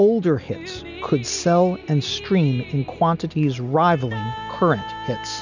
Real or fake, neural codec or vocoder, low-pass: real; none; 7.2 kHz